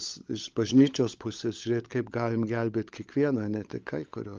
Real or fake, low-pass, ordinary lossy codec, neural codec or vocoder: fake; 7.2 kHz; Opus, 32 kbps; codec, 16 kHz, 16 kbps, FunCodec, trained on LibriTTS, 50 frames a second